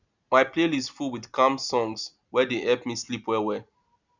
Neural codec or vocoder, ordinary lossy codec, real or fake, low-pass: none; none; real; 7.2 kHz